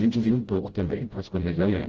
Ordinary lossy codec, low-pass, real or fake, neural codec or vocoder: Opus, 16 kbps; 7.2 kHz; fake; codec, 16 kHz, 0.5 kbps, FreqCodec, smaller model